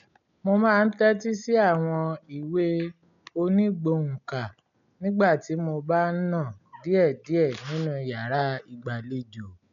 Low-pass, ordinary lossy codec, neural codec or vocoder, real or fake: 7.2 kHz; none; none; real